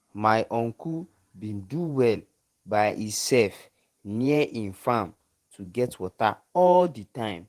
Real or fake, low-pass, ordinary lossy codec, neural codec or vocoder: fake; 14.4 kHz; Opus, 24 kbps; vocoder, 48 kHz, 128 mel bands, Vocos